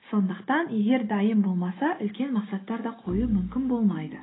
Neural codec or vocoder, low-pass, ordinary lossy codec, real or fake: none; 7.2 kHz; AAC, 16 kbps; real